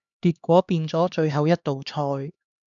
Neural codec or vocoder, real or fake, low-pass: codec, 16 kHz, 2 kbps, X-Codec, HuBERT features, trained on LibriSpeech; fake; 7.2 kHz